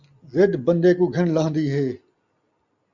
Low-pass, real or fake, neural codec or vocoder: 7.2 kHz; real; none